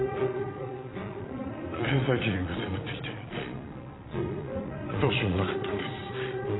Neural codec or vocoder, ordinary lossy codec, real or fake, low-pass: vocoder, 22.05 kHz, 80 mel bands, Vocos; AAC, 16 kbps; fake; 7.2 kHz